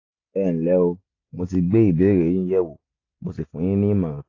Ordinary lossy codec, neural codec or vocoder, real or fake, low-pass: AAC, 32 kbps; none; real; 7.2 kHz